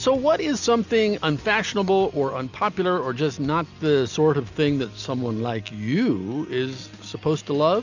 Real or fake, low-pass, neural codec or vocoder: real; 7.2 kHz; none